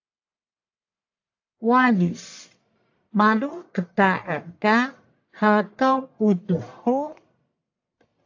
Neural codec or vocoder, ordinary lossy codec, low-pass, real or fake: codec, 44.1 kHz, 1.7 kbps, Pupu-Codec; AAC, 48 kbps; 7.2 kHz; fake